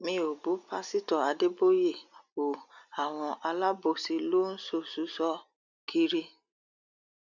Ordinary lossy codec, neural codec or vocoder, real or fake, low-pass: none; none; real; 7.2 kHz